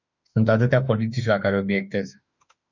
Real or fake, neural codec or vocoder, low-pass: fake; autoencoder, 48 kHz, 32 numbers a frame, DAC-VAE, trained on Japanese speech; 7.2 kHz